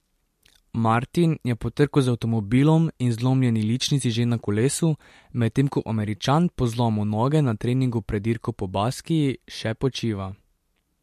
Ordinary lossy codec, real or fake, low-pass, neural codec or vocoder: MP3, 64 kbps; real; 14.4 kHz; none